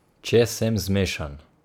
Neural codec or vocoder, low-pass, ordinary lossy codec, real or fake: none; 19.8 kHz; none; real